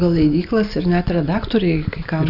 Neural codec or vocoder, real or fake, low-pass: none; real; 5.4 kHz